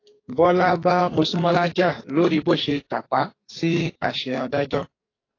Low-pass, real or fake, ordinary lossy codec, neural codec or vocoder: 7.2 kHz; fake; AAC, 32 kbps; codec, 44.1 kHz, 2.6 kbps, SNAC